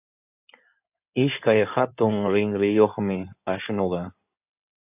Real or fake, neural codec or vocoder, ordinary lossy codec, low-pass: fake; codec, 16 kHz in and 24 kHz out, 2.2 kbps, FireRedTTS-2 codec; AAC, 32 kbps; 3.6 kHz